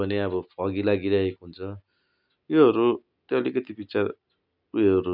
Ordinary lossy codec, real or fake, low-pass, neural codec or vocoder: none; real; 5.4 kHz; none